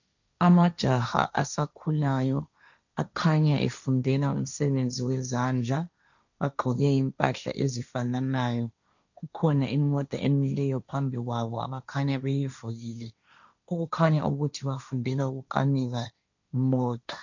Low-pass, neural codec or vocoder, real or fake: 7.2 kHz; codec, 16 kHz, 1.1 kbps, Voila-Tokenizer; fake